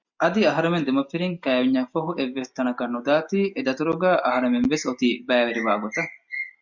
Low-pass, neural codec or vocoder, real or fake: 7.2 kHz; none; real